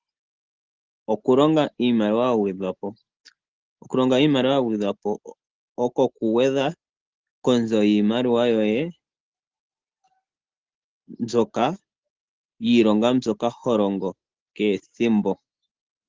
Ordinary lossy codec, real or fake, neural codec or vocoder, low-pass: Opus, 16 kbps; real; none; 7.2 kHz